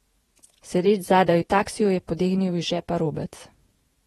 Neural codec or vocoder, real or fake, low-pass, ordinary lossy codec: vocoder, 48 kHz, 128 mel bands, Vocos; fake; 19.8 kHz; AAC, 32 kbps